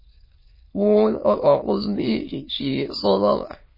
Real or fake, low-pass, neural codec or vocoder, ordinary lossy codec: fake; 5.4 kHz; autoencoder, 22.05 kHz, a latent of 192 numbers a frame, VITS, trained on many speakers; MP3, 24 kbps